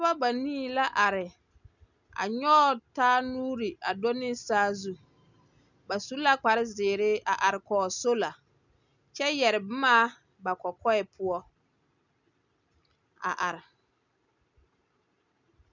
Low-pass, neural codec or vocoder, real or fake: 7.2 kHz; none; real